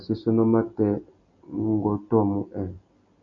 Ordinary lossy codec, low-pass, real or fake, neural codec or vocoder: MP3, 96 kbps; 7.2 kHz; real; none